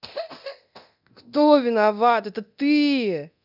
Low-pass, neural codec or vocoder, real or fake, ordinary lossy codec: 5.4 kHz; codec, 24 kHz, 0.9 kbps, DualCodec; fake; none